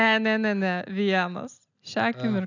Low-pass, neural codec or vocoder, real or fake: 7.2 kHz; none; real